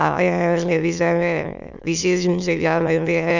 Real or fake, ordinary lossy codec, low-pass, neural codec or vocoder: fake; none; 7.2 kHz; autoencoder, 22.05 kHz, a latent of 192 numbers a frame, VITS, trained on many speakers